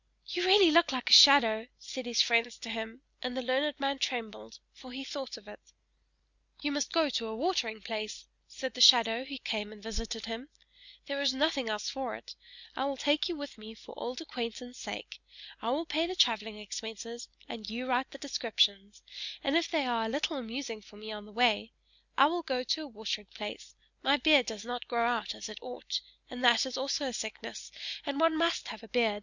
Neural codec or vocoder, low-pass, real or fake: none; 7.2 kHz; real